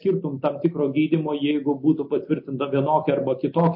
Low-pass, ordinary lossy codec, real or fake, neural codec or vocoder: 5.4 kHz; MP3, 32 kbps; real; none